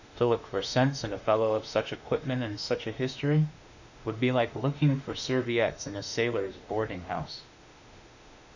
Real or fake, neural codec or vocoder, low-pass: fake; autoencoder, 48 kHz, 32 numbers a frame, DAC-VAE, trained on Japanese speech; 7.2 kHz